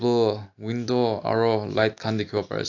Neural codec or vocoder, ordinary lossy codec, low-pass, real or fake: none; AAC, 48 kbps; 7.2 kHz; real